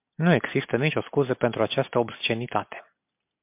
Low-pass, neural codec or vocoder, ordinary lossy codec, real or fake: 3.6 kHz; none; MP3, 32 kbps; real